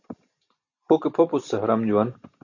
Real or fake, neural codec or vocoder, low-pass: real; none; 7.2 kHz